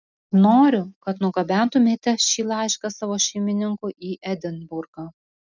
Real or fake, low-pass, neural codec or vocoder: real; 7.2 kHz; none